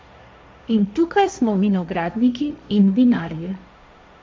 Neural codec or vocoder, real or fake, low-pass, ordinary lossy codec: codec, 16 kHz, 1.1 kbps, Voila-Tokenizer; fake; none; none